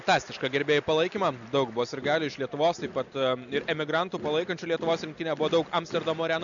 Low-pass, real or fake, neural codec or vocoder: 7.2 kHz; real; none